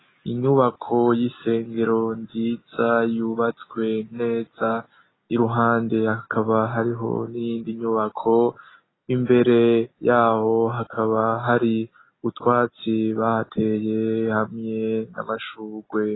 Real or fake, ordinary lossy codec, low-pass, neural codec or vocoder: real; AAC, 16 kbps; 7.2 kHz; none